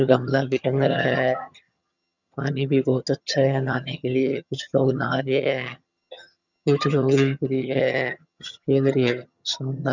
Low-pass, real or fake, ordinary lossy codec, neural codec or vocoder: 7.2 kHz; fake; none; vocoder, 22.05 kHz, 80 mel bands, HiFi-GAN